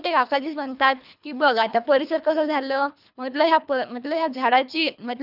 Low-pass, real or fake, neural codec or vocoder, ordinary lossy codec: 5.4 kHz; fake; codec, 24 kHz, 3 kbps, HILCodec; none